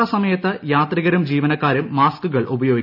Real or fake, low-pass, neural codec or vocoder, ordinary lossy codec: real; 5.4 kHz; none; none